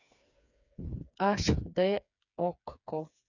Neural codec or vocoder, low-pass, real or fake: codec, 16 kHz, 4 kbps, FreqCodec, smaller model; 7.2 kHz; fake